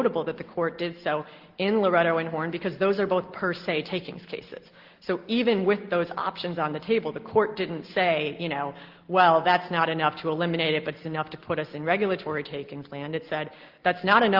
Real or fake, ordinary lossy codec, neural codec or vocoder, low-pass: real; Opus, 16 kbps; none; 5.4 kHz